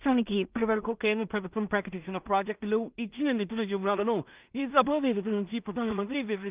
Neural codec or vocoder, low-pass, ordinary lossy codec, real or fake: codec, 16 kHz in and 24 kHz out, 0.4 kbps, LongCat-Audio-Codec, two codebook decoder; 3.6 kHz; Opus, 24 kbps; fake